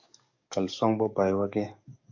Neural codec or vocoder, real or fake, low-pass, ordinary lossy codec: codec, 44.1 kHz, 7.8 kbps, DAC; fake; 7.2 kHz; AAC, 48 kbps